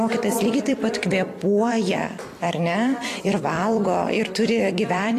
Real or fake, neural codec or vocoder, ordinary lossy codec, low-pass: fake; vocoder, 44.1 kHz, 128 mel bands every 256 samples, BigVGAN v2; AAC, 48 kbps; 14.4 kHz